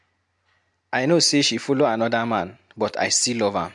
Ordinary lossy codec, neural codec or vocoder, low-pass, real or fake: MP3, 64 kbps; none; 10.8 kHz; real